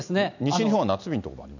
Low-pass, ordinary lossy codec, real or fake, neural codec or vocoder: 7.2 kHz; none; real; none